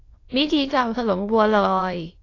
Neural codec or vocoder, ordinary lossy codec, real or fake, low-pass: autoencoder, 22.05 kHz, a latent of 192 numbers a frame, VITS, trained on many speakers; AAC, 32 kbps; fake; 7.2 kHz